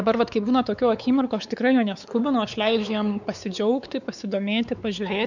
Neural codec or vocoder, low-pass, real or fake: codec, 16 kHz, 4 kbps, X-Codec, WavLM features, trained on Multilingual LibriSpeech; 7.2 kHz; fake